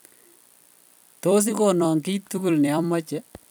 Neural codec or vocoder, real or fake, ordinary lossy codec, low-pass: vocoder, 44.1 kHz, 128 mel bands every 256 samples, BigVGAN v2; fake; none; none